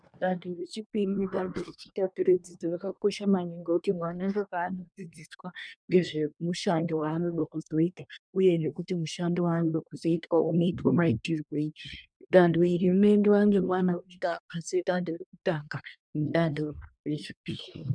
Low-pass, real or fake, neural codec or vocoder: 9.9 kHz; fake; codec, 24 kHz, 1 kbps, SNAC